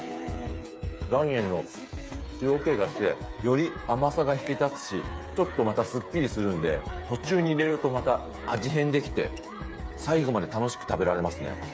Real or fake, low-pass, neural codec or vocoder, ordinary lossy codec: fake; none; codec, 16 kHz, 8 kbps, FreqCodec, smaller model; none